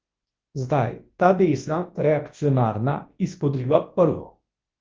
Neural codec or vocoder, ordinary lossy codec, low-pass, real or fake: codec, 24 kHz, 0.9 kbps, WavTokenizer, large speech release; Opus, 16 kbps; 7.2 kHz; fake